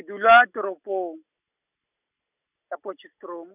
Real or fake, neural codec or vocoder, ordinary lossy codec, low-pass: real; none; none; 3.6 kHz